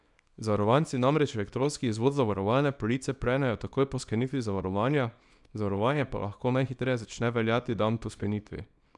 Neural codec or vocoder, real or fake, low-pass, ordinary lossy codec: codec, 24 kHz, 0.9 kbps, WavTokenizer, small release; fake; 10.8 kHz; none